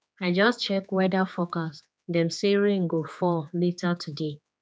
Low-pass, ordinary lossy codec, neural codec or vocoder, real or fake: none; none; codec, 16 kHz, 4 kbps, X-Codec, HuBERT features, trained on general audio; fake